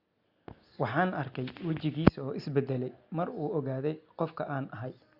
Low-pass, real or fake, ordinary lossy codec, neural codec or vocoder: 5.4 kHz; real; Opus, 64 kbps; none